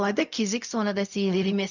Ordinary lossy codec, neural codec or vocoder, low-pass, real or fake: none; codec, 16 kHz, 0.4 kbps, LongCat-Audio-Codec; 7.2 kHz; fake